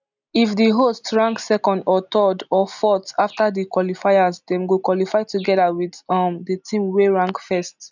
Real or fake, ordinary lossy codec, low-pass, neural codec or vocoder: real; none; 7.2 kHz; none